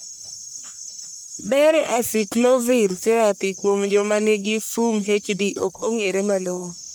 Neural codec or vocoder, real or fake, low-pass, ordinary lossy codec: codec, 44.1 kHz, 1.7 kbps, Pupu-Codec; fake; none; none